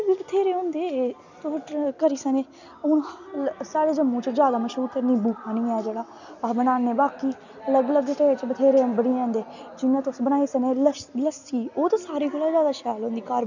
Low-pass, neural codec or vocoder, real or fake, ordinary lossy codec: 7.2 kHz; none; real; none